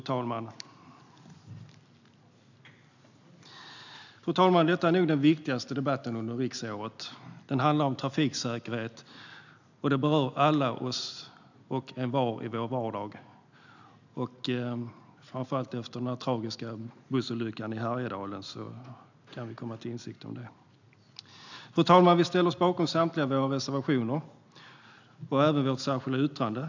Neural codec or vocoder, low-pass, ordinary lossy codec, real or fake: none; 7.2 kHz; none; real